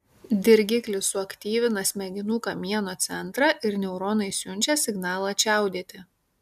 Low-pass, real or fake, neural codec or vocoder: 14.4 kHz; real; none